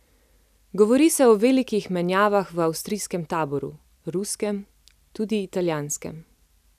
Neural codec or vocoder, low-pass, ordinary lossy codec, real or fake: none; 14.4 kHz; none; real